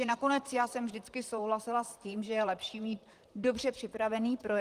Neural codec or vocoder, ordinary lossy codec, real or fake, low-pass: vocoder, 44.1 kHz, 128 mel bands, Pupu-Vocoder; Opus, 24 kbps; fake; 14.4 kHz